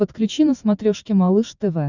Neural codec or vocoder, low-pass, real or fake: vocoder, 44.1 kHz, 128 mel bands every 512 samples, BigVGAN v2; 7.2 kHz; fake